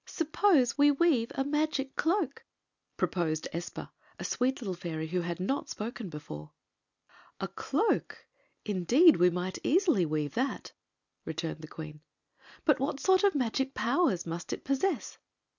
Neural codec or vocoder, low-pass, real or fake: none; 7.2 kHz; real